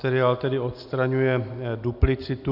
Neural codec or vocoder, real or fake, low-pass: none; real; 5.4 kHz